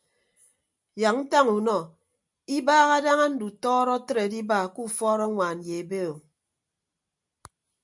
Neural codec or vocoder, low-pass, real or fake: none; 10.8 kHz; real